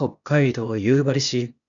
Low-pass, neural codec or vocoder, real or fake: 7.2 kHz; codec, 16 kHz, 0.8 kbps, ZipCodec; fake